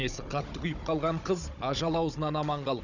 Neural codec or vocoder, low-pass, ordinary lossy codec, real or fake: codec, 16 kHz, 16 kbps, FunCodec, trained on Chinese and English, 50 frames a second; 7.2 kHz; none; fake